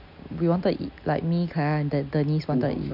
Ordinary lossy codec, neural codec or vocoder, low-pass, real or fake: none; none; 5.4 kHz; real